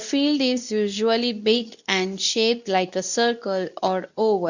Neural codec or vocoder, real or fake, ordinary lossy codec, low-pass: codec, 24 kHz, 0.9 kbps, WavTokenizer, medium speech release version 2; fake; none; 7.2 kHz